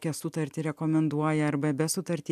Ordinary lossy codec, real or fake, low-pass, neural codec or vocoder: AAC, 96 kbps; real; 14.4 kHz; none